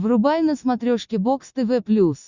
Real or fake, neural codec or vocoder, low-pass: real; none; 7.2 kHz